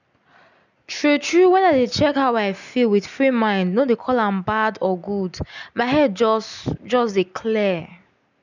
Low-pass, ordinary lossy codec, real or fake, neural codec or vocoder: 7.2 kHz; none; real; none